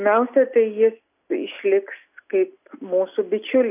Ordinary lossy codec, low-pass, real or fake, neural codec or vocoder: AAC, 32 kbps; 3.6 kHz; real; none